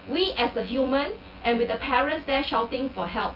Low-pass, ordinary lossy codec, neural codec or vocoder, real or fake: 5.4 kHz; Opus, 24 kbps; vocoder, 24 kHz, 100 mel bands, Vocos; fake